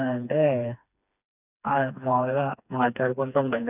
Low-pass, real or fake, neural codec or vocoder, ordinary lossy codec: 3.6 kHz; fake; codec, 16 kHz, 2 kbps, FreqCodec, smaller model; none